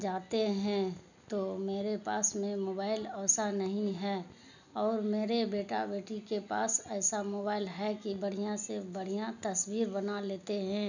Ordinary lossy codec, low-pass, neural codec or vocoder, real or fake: none; 7.2 kHz; none; real